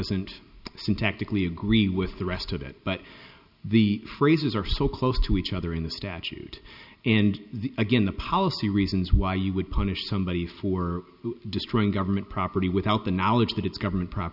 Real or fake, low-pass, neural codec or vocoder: real; 5.4 kHz; none